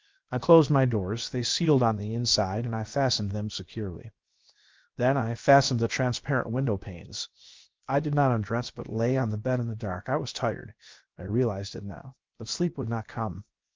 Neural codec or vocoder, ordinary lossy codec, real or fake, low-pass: codec, 16 kHz, 0.7 kbps, FocalCodec; Opus, 32 kbps; fake; 7.2 kHz